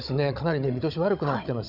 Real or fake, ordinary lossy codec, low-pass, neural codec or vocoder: fake; none; 5.4 kHz; codec, 16 kHz, 16 kbps, FunCodec, trained on Chinese and English, 50 frames a second